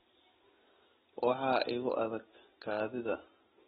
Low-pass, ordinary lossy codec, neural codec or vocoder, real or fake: 19.8 kHz; AAC, 16 kbps; none; real